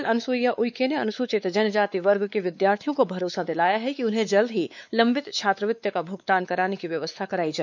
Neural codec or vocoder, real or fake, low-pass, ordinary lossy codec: codec, 16 kHz, 4 kbps, X-Codec, WavLM features, trained on Multilingual LibriSpeech; fake; 7.2 kHz; none